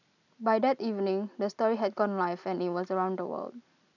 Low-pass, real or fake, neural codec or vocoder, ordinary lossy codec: 7.2 kHz; real; none; none